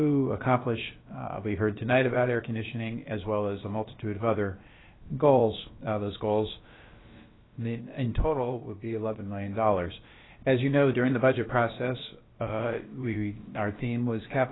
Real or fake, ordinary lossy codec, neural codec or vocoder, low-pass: fake; AAC, 16 kbps; codec, 16 kHz, about 1 kbps, DyCAST, with the encoder's durations; 7.2 kHz